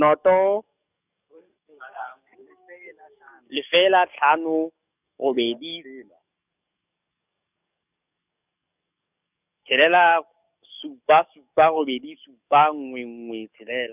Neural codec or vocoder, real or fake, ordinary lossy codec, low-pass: codec, 44.1 kHz, 7.8 kbps, DAC; fake; none; 3.6 kHz